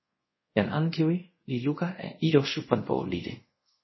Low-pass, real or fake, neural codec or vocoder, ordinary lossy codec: 7.2 kHz; fake; codec, 24 kHz, 0.5 kbps, DualCodec; MP3, 24 kbps